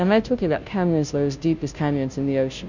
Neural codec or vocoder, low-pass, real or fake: codec, 16 kHz, 0.5 kbps, FunCodec, trained on Chinese and English, 25 frames a second; 7.2 kHz; fake